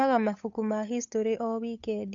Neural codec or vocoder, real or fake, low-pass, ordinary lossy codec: codec, 16 kHz, 8 kbps, FunCodec, trained on Chinese and English, 25 frames a second; fake; 7.2 kHz; AAC, 64 kbps